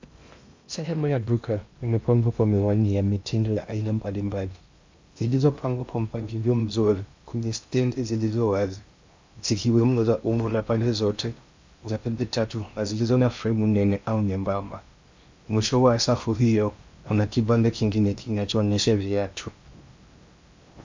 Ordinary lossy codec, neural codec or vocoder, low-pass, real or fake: MP3, 64 kbps; codec, 16 kHz in and 24 kHz out, 0.6 kbps, FocalCodec, streaming, 2048 codes; 7.2 kHz; fake